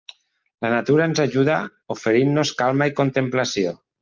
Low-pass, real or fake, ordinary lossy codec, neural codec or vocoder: 7.2 kHz; real; Opus, 24 kbps; none